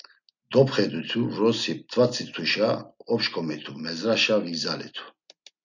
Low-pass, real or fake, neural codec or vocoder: 7.2 kHz; real; none